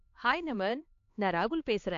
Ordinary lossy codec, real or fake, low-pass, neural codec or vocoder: AAC, 48 kbps; fake; 7.2 kHz; codec, 16 kHz, 2 kbps, X-Codec, HuBERT features, trained on LibriSpeech